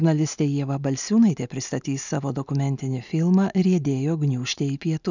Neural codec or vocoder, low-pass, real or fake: none; 7.2 kHz; real